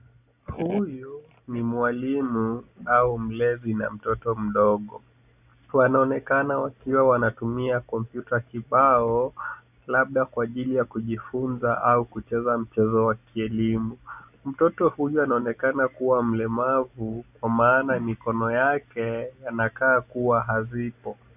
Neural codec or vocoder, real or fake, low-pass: none; real; 3.6 kHz